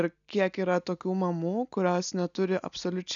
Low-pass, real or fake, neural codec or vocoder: 7.2 kHz; real; none